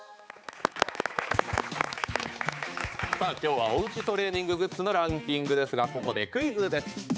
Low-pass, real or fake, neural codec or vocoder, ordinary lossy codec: none; fake; codec, 16 kHz, 4 kbps, X-Codec, HuBERT features, trained on balanced general audio; none